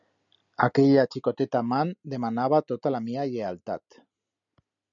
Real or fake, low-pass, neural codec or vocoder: real; 7.2 kHz; none